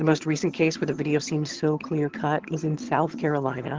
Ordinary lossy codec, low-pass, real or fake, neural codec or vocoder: Opus, 16 kbps; 7.2 kHz; fake; vocoder, 22.05 kHz, 80 mel bands, HiFi-GAN